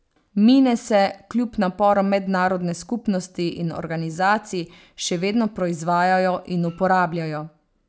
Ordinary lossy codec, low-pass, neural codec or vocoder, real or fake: none; none; none; real